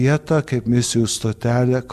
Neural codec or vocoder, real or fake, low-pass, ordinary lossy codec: none; real; 14.4 kHz; MP3, 96 kbps